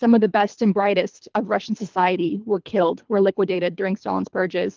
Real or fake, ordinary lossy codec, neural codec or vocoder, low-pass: fake; Opus, 32 kbps; codec, 24 kHz, 3 kbps, HILCodec; 7.2 kHz